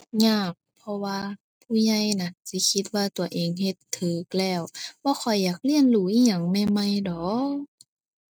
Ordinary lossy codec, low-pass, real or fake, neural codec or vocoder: none; none; real; none